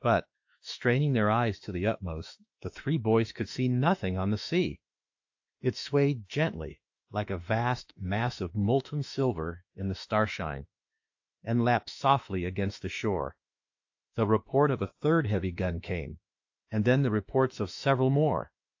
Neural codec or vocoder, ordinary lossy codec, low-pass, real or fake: autoencoder, 48 kHz, 32 numbers a frame, DAC-VAE, trained on Japanese speech; AAC, 48 kbps; 7.2 kHz; fake